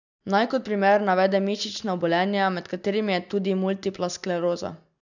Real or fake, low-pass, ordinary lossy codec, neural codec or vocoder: real; 7.2 kHz; none; none